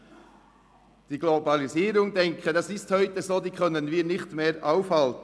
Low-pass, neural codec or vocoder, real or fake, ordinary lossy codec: none; none; real; none